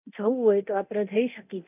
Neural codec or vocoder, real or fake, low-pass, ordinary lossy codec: codec, 24 kHz, 0.9 kbps, DualCodec; fake; 3.6 kHz; none